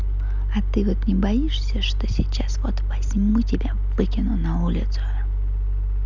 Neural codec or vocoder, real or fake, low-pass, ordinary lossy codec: none; real; 7.2 kHz; none